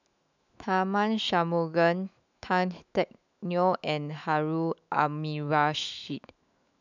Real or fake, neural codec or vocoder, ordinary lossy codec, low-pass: fake; autoencoder, 48 kHz, 128 numbers a frame, DAC-VAE, trained on Japanese speech; none; 7.2 kHz